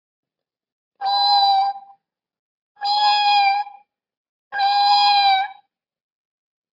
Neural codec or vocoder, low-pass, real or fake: none; 5.4 kHz; real